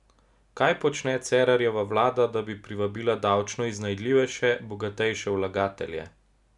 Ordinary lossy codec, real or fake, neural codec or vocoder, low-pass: none; real; none; 10.8 kHz